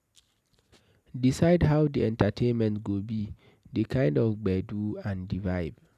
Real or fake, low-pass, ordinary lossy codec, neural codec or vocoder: real; 14.4 kHz; none; none